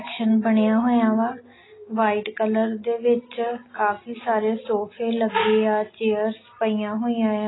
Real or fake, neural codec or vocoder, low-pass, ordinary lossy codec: real; none; 7.2 kHz; AAC, 16 kbps